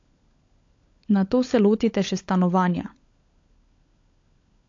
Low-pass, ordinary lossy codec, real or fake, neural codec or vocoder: 7.2 kHz; AAC, 48 kbps; fake; codec, 16 kHz, 16 kbps, FunCodec, trained on LibriTTS, 50 frames a second